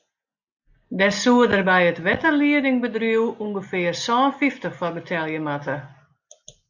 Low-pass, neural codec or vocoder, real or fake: 7.2 kHz; none; real